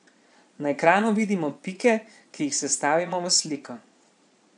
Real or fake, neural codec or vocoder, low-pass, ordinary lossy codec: fake; vocoder, 22.05 kHz, 80 mel bands, Vocos; 9.9 kHz; none